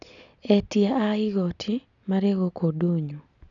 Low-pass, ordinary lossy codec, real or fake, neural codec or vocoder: 7.2 kHz; none; real; none